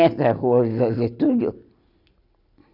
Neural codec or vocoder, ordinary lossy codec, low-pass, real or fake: none; none; 5.4 kHz; real